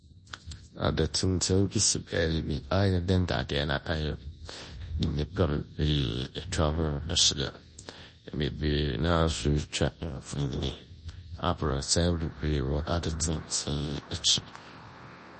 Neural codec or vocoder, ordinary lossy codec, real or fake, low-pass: codec, 24 kHz, 0.9 kbps, WavTokenizer, large speech release; MP3, 32 kbps; fake; 10.8 kHz